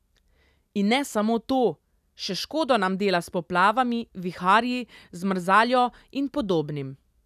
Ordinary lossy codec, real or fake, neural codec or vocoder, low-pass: none; real; none; 14.4 kHz